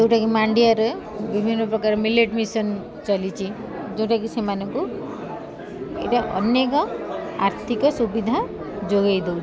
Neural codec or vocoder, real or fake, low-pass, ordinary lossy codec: none; real; none; none